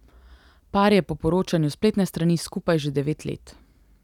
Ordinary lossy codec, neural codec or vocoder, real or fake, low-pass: none; none; real; 19.8 kHz